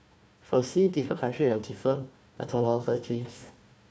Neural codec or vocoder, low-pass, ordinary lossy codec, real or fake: codec, 16 kHz, 1 kbps, FunCodec, trained on Chinese and English, 50 frames a second; none; none; fake